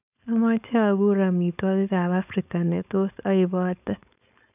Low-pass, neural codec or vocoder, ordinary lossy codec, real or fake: 3.6 kHz; codec, 16 kHz, 4.8 kbps, FACodec; none; fake